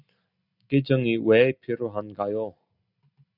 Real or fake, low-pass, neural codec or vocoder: real; 5.4 kHz; none